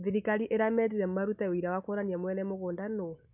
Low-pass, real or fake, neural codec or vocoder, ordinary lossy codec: 3.6 kHz; real; none; none